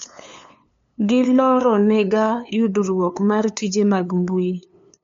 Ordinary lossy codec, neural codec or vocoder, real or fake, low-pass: MP3, 48 kbps; codec, 16 kHz, 2 kbps, FunCodec, trained on LibriTTS, 25 frames a second; fake; 7.2 kHz